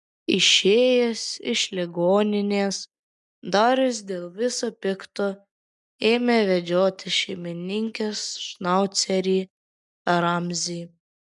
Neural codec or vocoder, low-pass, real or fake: none; 10.8 kHz; real